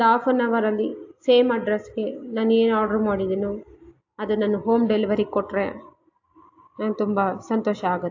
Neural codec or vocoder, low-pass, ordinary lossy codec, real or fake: none; 7.2 kHz; AAC, 48 kbps; real